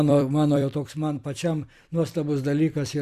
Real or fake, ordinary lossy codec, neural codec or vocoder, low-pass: fake; Opus, 64 kbps; vocoder, 44.1 kHz, 128 mel bands every 256 samples, BigVGAN v2; 14.4 kHz